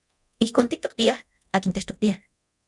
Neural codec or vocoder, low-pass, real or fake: codec, 24 kHz, 0.9 kbps, DualCodec; 10.8 kHz; fake